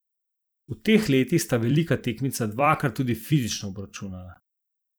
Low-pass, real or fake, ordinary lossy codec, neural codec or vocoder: none; fake; none; vocoder, 44.1 kHz, 128 mel bands every 256 samples, BigVGAN v2